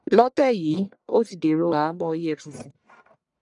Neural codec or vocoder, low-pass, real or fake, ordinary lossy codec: codec, 44.1 kHz, 1.7 kbps, Pupu-Codec; 10.8 kHz; fake; none